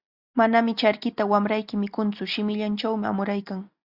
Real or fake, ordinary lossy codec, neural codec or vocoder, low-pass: real; Opus, 64 kbps; none; 5.4 kHz